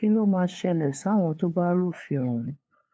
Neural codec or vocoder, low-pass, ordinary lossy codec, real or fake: codec, 16 kHz, 2 kbps, FunCodec, trained on LibriTTS, 25 frames a second; none; none; fake